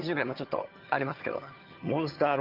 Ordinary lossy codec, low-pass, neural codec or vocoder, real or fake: Opus, 24 kbps; 5.4 kHz; vocoder, 22.05 kHz, 80 mel bands, HiFi-GAN; fake